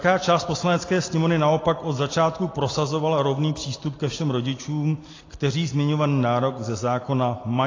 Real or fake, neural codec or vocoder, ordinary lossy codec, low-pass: real; none; AAC, 32 kbps; 7.2 kHz